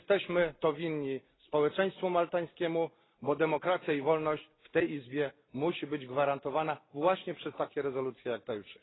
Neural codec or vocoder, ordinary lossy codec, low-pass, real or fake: none; AAC, 16 kbps; 7.2 kHz; real